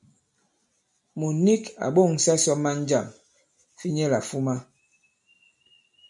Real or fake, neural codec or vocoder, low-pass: real; none; 10.8 kHz